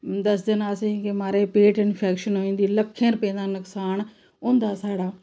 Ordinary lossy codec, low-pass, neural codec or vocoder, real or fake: none; none; none; real